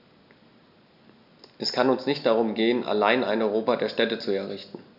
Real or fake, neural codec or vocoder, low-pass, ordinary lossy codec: real; none; 5.4 kHz; none